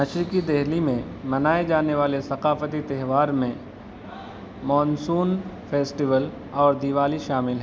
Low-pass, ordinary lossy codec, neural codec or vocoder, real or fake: none; none; none; real